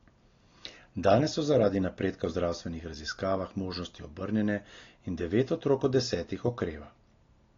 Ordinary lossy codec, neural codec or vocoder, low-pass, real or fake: AAC, 32 kbps; none; 7.2 kHz; real